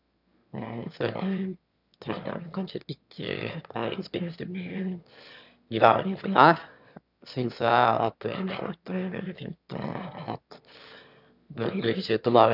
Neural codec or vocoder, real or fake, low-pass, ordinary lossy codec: autoencoder, 22.05 kHz, a latent of 192 numbers a frame, VITS, trained on one speaker; fake; 5.4 kHz; none